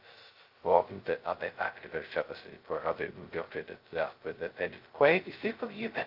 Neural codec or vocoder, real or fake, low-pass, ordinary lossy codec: codec, 16 kHz, 0.2 kbps, FocalCodec; fake; 5.4 kHz; Opus, 32 kbps